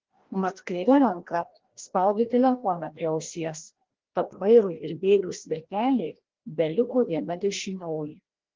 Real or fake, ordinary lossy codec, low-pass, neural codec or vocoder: fake; Opus, 16 kbps; 7.2 kHz; codec, 16 kHz, 1 kbps, FreqCodec, larger model